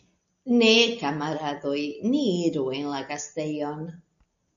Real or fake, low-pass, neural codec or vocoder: real; 7.2 kHz; none